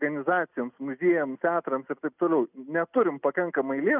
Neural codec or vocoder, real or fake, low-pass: none; real; 3.6 kHz